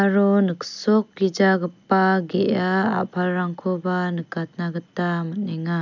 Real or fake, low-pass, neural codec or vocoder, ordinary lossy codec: real; 7.2 kHz; none; none